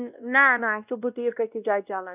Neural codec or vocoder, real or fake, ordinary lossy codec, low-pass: codec, 16 kHz, 1 kbps, X-Codec, WavLM features, trained on Multilingual LibriSpeech; fake; none; 3.6 kHz